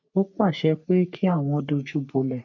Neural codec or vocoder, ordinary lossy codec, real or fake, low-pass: codec, 44.1 kHz, 3.4 kbps, Pupu-Codec; none; fake; 7.2 kHz